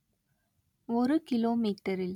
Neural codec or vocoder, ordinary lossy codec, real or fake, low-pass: none; none; real; 19.8 kHz